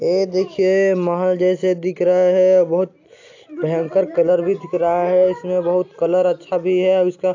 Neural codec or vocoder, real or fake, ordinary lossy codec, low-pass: none; real; AAC, 48 kbps; 7.2 kHz